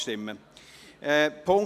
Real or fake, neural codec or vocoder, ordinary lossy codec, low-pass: real; none; none; 14.4 kHz